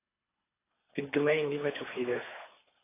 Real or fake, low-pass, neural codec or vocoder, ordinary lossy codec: fake; 3.6 kHz; codec, 24 kHz, 3 kbps, HILCodec; AAC, 16 kbps